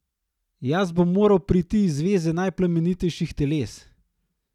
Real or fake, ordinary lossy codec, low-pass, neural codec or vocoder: real; none; 19.8 kHz; none